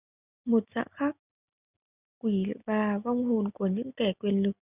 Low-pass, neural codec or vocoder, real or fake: 3.6 kHz; none; real